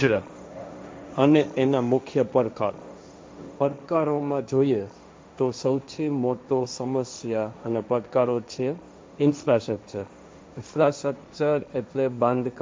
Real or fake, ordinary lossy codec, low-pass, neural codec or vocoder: fake; none; none; codec, 16 kHz, 1.1 kbps, Voila-Tokenizer